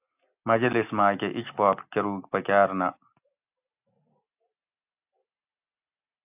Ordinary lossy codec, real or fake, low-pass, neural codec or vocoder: AAC, 32 kbps; real; 3.6 kHz; none